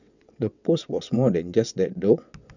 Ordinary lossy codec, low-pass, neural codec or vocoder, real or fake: none; 7.2 kHz; vocoder, 22.05 kHz, 80 mel bands, WaveNeXt; fake